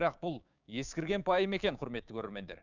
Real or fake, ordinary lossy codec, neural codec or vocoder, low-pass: fake; none; codec, 16 kHz, 6 kbps, DAC; 7.2 kHz